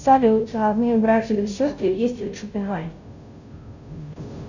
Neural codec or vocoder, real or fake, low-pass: codec, 16 kHz, 0.5 kbps, FunCodec, trained on Chinese and English, 25 frames a second; fake; 7.2 kHz